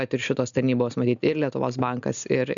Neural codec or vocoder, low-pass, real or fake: none; 7.2 kHz; real